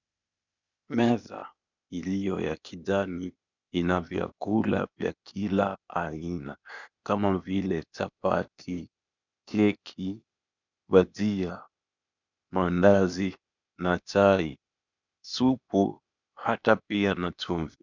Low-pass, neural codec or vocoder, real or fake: 7.2 kHz; codec, 16 kHz, 0.8 kbps, ZipCodec; fake